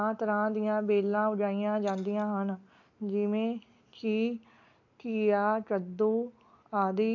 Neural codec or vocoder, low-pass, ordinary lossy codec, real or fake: none; 7.2 kHz; AAC, 48 kbps; real